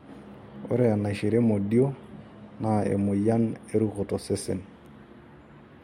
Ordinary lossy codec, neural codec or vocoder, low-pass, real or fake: MP3, 64 kbps; none; 19.8 kHz; real